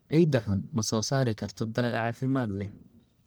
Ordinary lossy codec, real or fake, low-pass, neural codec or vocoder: none; fake; none; codec, 44.1 kHz, 1.7 kbps, Pupu-Codec